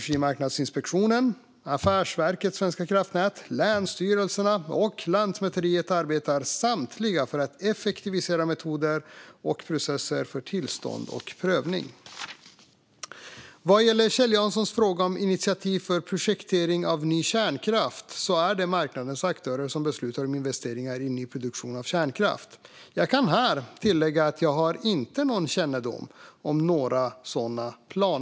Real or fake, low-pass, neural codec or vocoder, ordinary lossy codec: real; none; none; none